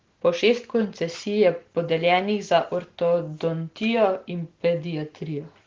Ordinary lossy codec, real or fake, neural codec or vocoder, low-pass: Opus, 16 kbps; real; none; 7.2 kHz